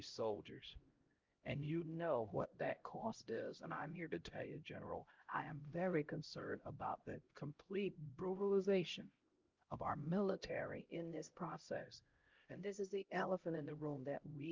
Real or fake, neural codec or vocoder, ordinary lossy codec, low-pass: fake; codec, 16 kHz, 0.5 kbps, X-Codec, HuBERT features, trained on LibriSpeech; Opus, 32 kbps; 7.2 kHz